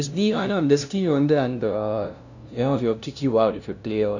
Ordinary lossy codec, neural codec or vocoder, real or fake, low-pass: none; codec, 16 kHz, 0.5 kbps, FunCodec, trained on LibriTTS, 25 frames a second; fake; 7.2 kHz